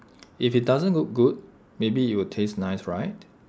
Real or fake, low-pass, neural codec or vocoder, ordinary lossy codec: real; none; none; none